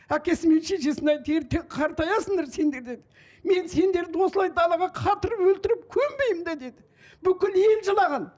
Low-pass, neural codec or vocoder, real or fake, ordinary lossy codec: none; none; real; none